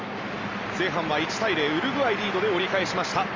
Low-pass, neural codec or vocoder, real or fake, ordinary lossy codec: 7.2 kHz; none; real; Opus, 32 kbps